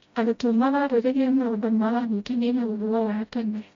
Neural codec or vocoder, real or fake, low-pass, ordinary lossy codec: codec, 16 kHz, 0.5 kbps, FreqCodec, smaller model; fake; 7.2 kHz; MP3, 48 kbps